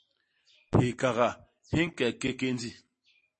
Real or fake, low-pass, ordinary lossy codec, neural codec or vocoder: real; 10.8 kHz; MP3, 32 kbps; none